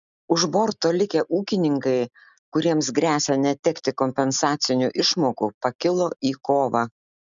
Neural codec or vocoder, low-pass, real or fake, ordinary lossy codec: none; 7.2 kHz; real; MP3, 96 kbps